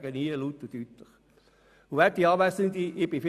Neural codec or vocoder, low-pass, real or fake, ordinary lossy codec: none; 14.4 kHz; real; none